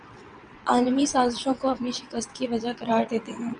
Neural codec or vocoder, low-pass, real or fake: vocoder, 22.05 kHz, 80 mel bands, WaveNeXt; 9.9 kHz; fake